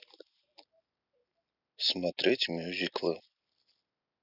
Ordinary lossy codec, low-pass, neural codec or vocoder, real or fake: none; 5.4 kHz; none; real